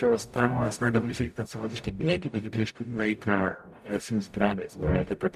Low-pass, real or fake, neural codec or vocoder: 14.4 kHz; fake; codec, 44.1 kHz, 0.9 kbps, DAC